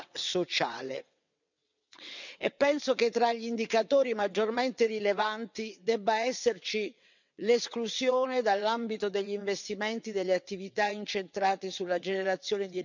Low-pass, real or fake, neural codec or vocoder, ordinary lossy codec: 7.2 kHz; fake; vocoder, 22.05 kHz, 80 mel bands, WaveNeXt; none